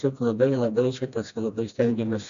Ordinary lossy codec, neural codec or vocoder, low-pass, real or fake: AAC, 64 kbps; codec, 16 kHz, 1 kbps, FreqCodec, smaller model; 7.2 kHz; fake